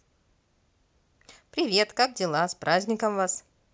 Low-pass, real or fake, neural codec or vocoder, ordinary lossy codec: none; real; none; none